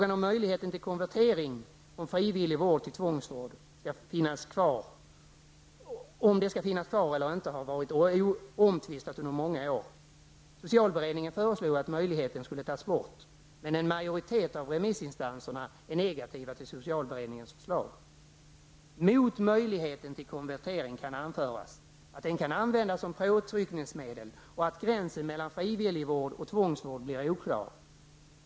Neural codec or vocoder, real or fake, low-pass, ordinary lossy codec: none; real; none; none